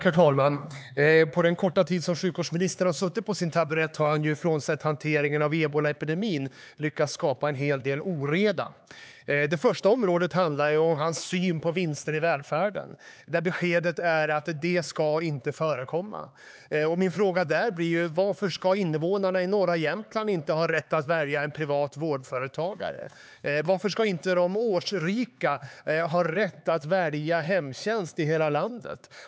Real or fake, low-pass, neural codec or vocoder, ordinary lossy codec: fake; none; codec, 16 kHz, 4 kbps, X-Codec, HuBERT features, trained on LibriSpeech; none